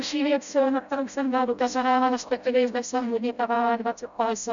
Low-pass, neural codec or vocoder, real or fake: 7.2 kHz; codec, 16 kHz, 0.5 kbps, FreqCodec, smaller model; fake